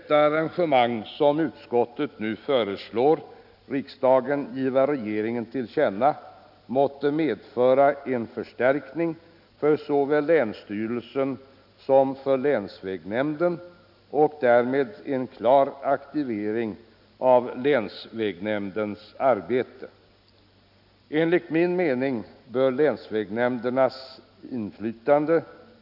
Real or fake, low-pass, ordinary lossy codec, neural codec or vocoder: real; 5.4 kHz; none; none